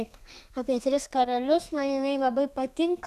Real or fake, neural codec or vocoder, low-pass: fake; codec, 32 kHz, 1.9 kbps, SNAC; 14.4 kHz